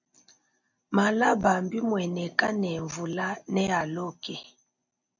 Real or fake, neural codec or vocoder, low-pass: real; none; 7.2 kHz